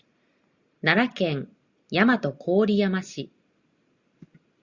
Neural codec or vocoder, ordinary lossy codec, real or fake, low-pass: none; Opus, 64 kbps; real; 7.2 kHz